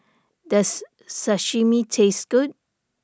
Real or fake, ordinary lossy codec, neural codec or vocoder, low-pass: real; none; none; none